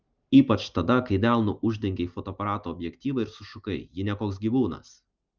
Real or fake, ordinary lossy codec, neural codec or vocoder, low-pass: real; Opus, 32 kbps; none; 7.2 kHz